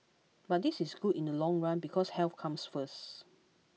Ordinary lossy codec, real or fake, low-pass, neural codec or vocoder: none; real; none; none